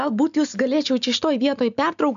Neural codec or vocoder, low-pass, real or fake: none; 7.2 kHz; real